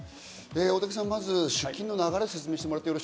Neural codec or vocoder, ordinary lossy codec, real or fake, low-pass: none; none; real; none